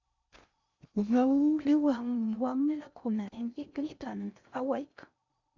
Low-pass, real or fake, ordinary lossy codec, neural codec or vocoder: 7.2 kHz; fake; Opus, 64 kbps; codec, 16 kHz in and 24 kHz out, 0.6 kbps, FocalCodec, streaming, 2048 codes